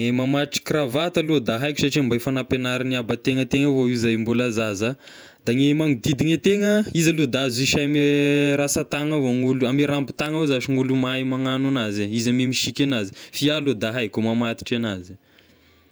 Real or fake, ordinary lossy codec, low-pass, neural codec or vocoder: fake; none; none; vocoder, 48 kHz, 128 mel bands, Vocos